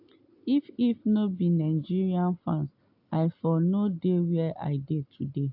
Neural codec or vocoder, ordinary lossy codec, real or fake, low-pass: none; AAC, 48 kbps; real; 5.4 kHz